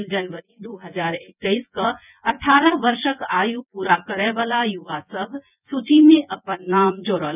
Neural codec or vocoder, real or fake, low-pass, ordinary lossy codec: vocoder, 24 kHz, 100 mel bands, Vocos; fake; 3.6 kHz; none